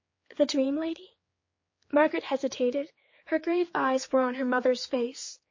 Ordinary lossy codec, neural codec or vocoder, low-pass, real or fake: MP3, 32 kbps; codec, 16 kHz, 4 kbps, X-Codec, HuBERT features, trained on general audio; 7.2 kHz; fake